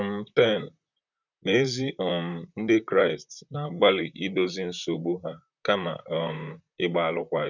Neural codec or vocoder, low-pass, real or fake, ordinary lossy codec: vocoder, 44.1 kHz, 128 mel bands, Pupu-Vocoder; 7.2 kHz; fake; none